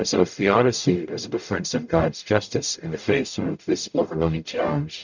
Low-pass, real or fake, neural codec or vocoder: 7.2 kHz; fake; codec, 44.1 kHz, 0.9 kbps, DAC